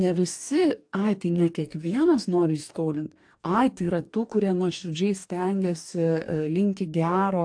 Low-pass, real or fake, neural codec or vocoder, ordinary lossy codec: 9.9 kHz; fake; codec, 44.1 kHz, 2.6 kbps, DAC; AAC, 64 kbps